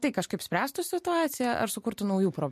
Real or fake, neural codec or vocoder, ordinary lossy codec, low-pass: real; none; MP3, 64 kbps; 14.4 kHz